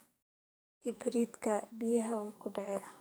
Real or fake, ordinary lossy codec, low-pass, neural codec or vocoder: fake; none; none; codec, 44.1 kHz, 2.6 kbps, SNAC